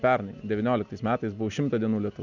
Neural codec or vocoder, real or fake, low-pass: none; real; 7.2 kHz